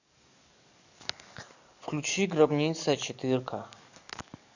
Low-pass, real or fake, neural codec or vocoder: 7.2 kHz; fake; codec, 44.1 kHz, 7.8 kbps, DAC